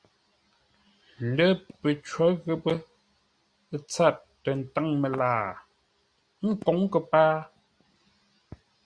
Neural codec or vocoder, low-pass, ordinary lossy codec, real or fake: none; 9.9 kHz; Opus, 64 kbps; real